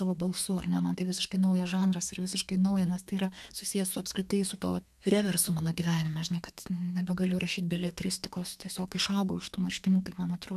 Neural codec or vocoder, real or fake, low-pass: codec, 32 kHz, 1.9 kbps, SNAC; fake; 14.4 kHz